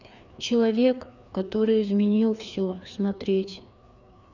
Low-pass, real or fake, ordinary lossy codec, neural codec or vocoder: 7.2 kHz; fake; none; codec, 16 kHz, 2 kbps, FreqCodec, larger model